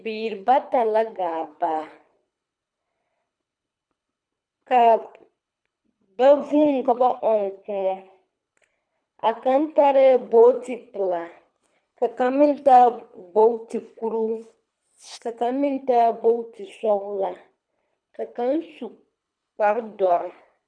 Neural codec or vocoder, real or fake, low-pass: codec, 24 kHz, 3 kbps, HILCodec; fake; 9.9 kHz